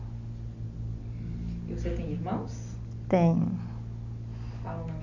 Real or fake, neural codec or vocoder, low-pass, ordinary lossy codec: real; none; 7.2 kHz; none